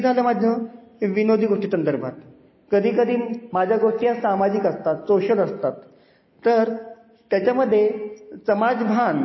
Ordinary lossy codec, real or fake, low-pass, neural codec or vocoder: MP3, 24 kbps; real; 7.2 kHz; none